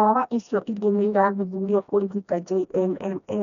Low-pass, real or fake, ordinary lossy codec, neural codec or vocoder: 7.2 kHz; fake; none; codec, 16 kHz, 1 kbps, FreqCodec, smaller model